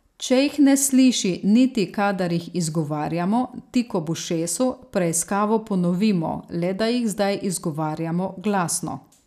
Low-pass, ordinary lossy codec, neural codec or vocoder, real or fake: 14.4 kHz; none; none; real